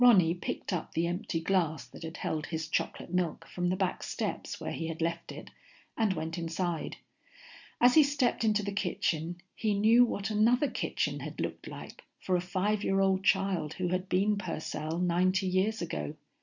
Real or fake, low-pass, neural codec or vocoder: real; 7.2 kHz; none